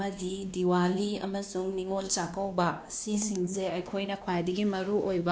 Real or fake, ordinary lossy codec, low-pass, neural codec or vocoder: fake; none; none; codec, 16 kHz, 2 kbps, X-Codec, WavLM features, trained on Multilingual LibriSpeech